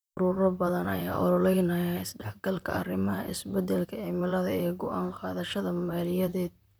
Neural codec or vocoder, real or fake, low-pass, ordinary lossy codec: vocoder, 44.1 kHz, 128 mel bands, Pupu-Vocoder; fake; none; none